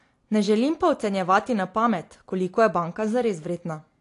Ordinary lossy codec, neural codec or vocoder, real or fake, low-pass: AAC, 48 kbps; none; real; 10.8 kHz